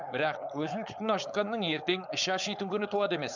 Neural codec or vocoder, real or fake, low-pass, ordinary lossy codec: codec, 16 kHz, 4.8 kbps, FACodec; fake; 7.2 kHz; none